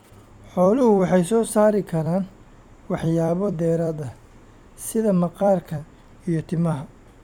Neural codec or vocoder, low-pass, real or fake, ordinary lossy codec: vocoder, 44.1 kHz, 128 mel bands every 256 samples, BigVGAN v2; 19.8 kHz; fake; none